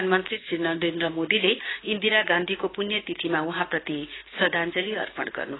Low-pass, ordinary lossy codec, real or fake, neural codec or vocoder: 7.2 kHz; AAC, 16 kbps; fake; codec, 16 kHz, 6 kbps, DAC